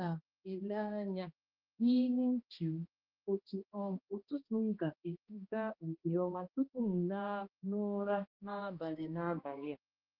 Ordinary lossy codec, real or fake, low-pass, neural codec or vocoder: Opus, 32 kbps; fake; 5.4 kHz; codec, 16 kHz, 1 kbps, X-Codec, HuBERT features, trained on balanced general audio